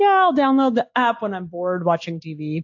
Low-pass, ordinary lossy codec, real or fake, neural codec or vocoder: 7.2 kHz; AAC, 48 kbps; real; none